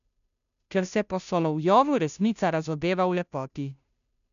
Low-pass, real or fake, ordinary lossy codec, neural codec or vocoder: 7.2 kHz; fake; MP3, 96 kbps; codec, 16 kHz, 0.5 kbps, FunCodec, trained on Chinese and English, 25 frames a second